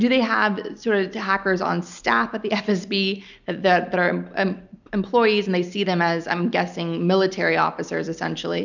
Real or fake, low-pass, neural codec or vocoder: real; 7.2 kHz; none